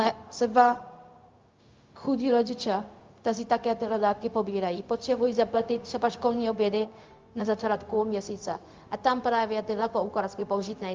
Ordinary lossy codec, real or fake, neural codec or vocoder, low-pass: Opus, 24 kbps; fake; codec, 16 kHz, 0.4 kbps, LongCat-Audio-Codec; 7.2 kHz